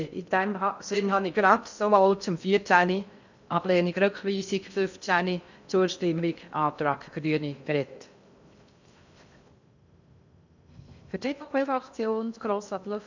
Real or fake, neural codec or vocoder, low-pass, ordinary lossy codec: fake; codec, 16 kHz in and 24 kHz out, 0.6 kbps, FocalCodec, streaming, 2048 codes; 7.2 kHz; none